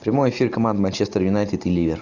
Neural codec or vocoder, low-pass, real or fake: none; 7.2 kHz; real